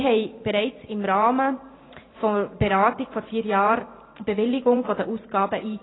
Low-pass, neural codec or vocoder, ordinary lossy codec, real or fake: 7.2 kHz; vocoder, 44.1 kHz, 128 mel bands every 512 samples, BigVGAN v2; AAC, 16 kbps; fake